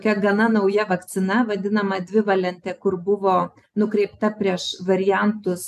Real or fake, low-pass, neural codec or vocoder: real; 14.4 kHz; none